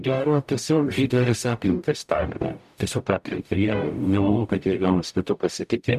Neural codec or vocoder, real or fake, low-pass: codec, 44.1 kHz, 0.9 kbps, DAC; fake; 14.4 kHz